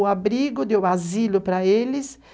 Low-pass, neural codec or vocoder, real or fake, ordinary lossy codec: none; none; real; none